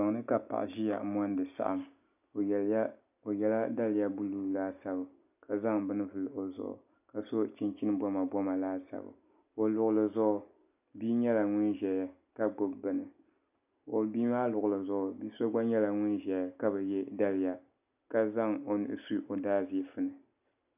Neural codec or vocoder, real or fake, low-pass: none; real; 3.6 kHz